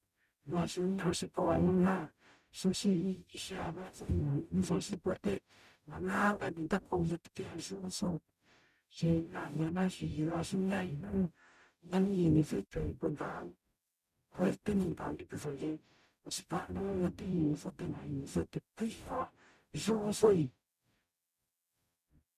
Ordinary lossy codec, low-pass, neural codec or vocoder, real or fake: none; 14.4 kHz; codec, 44.1 kHz, 0.9 kbps, DAC; fake